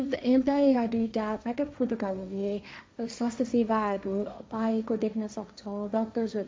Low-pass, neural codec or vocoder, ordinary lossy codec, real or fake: none; codec, 16 kHz, 1.1 kbps, Voila-Tokenizer; none; fake